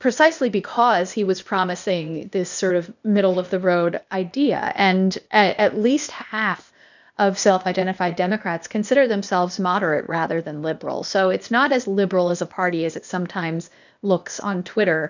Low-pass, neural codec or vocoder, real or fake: 7.2 kHz; codec, 16 kHz, 0.8 kbps, ZipCodec; fake